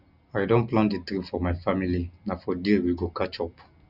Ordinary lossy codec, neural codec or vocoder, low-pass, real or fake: none; none; 5.4 kHz; real